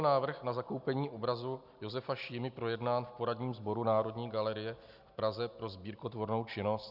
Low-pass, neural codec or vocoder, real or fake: 5.4 kHz; none; real